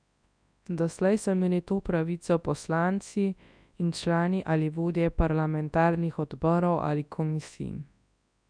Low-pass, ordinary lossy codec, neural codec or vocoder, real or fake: 9.9 kHz; none; codec, 24 kHz, 0.9 kbps, WavTokenizer, large speech release; fake